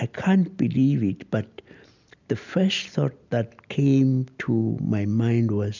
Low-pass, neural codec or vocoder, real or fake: 7.2 kHz; none; real